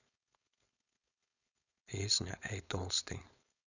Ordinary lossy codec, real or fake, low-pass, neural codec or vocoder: none; fake; 7.2 kHz; codec, 16 kHz, 4.8 kbps, FACodec